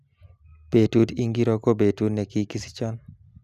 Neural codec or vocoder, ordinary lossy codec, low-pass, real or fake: none; none; 14.4 kHz; real